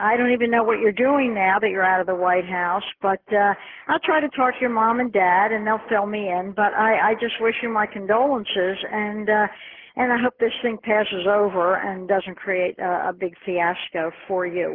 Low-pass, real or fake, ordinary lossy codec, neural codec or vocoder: 5.4 kHz; real; Opus, 16 kbps; none